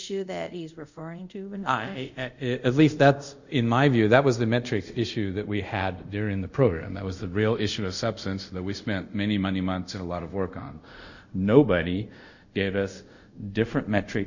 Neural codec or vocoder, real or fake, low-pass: codec, 24 kHz, 0.5 kbps, DualCodec; fake; 7.2 kHz